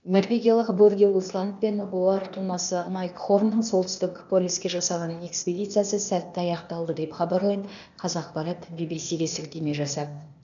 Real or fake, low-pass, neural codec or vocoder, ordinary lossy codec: fake; 7.2 kHz; codec, 16 kHz, 0.8 kbps, ZipCodec; none